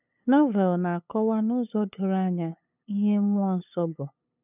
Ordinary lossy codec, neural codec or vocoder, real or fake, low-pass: none; codec, 16 kHz, 8 kbps, FunCodec, trained on LibriTTS, 25 frames a second; fake; 3.6 kHz